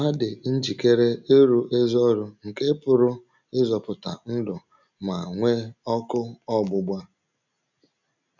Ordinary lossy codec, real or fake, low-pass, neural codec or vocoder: none; real; 7.2 kHz; none